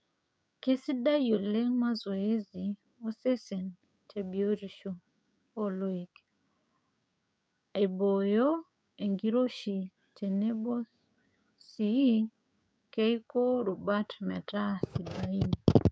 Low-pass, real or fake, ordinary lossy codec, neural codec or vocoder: none; fake; none; codec, 16 kHz, 6 kbps, DAC